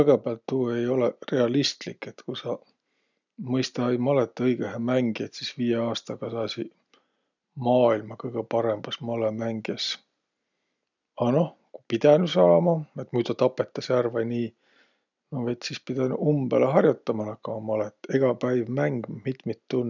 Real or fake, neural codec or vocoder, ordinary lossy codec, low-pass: real; none; none; 7.2 kHz